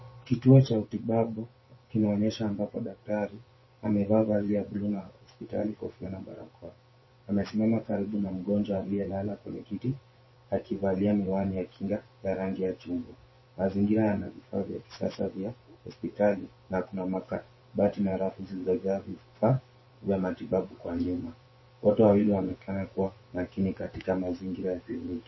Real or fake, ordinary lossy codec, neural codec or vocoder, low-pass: fake; MP3, 24 kbps; vocoder, 24 kHz, 100 mel bands, Vocos; 7.2 kHz